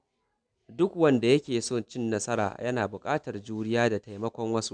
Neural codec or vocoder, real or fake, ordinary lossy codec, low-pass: none; real; none; 9.9 kHz